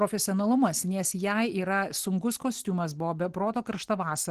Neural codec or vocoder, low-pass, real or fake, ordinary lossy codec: none; 14.4 kHz; real; Opus, 16 kbps